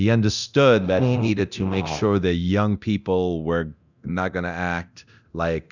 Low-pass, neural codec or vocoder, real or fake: 7.2 kHz; codec, 24 kHz, 0.9 kbps, DualCodec; fake